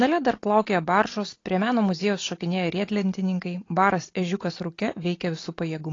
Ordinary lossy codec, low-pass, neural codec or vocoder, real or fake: AAC, 32 kbps; 7.2 kHz; none; real